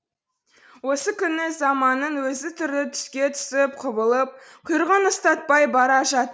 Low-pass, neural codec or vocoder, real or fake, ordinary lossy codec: none; none; real; none